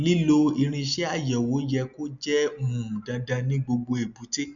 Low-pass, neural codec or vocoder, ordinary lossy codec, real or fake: 7.2 kHz; none; none; real